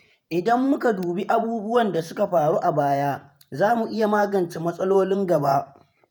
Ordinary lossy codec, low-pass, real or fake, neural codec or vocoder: none; none; fake; vocoder, 48 kHz, 128 mel bands, Vocos